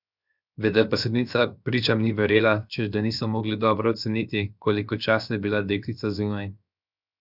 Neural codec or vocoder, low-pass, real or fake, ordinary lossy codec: codec, 16 kHz, 0.7 kbps, FocalCodec; 5.4 kHz; fake; none